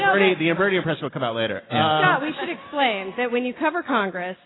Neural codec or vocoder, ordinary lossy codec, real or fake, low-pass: none; AAC, 16 kbps; real; 7.2 kHz